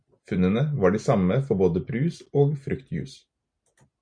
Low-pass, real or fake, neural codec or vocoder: 9.9 kHz; real; none